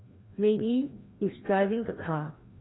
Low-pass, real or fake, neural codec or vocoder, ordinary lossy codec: 7.2 kHz; fake; codec, 16 kHz, 1 kbps, FreqCodec, larger model; AAC, 16 kbps